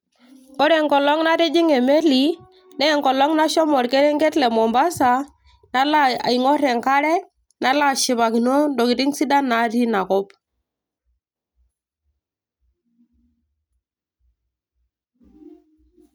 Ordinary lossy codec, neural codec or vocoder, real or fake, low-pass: none; none; real; none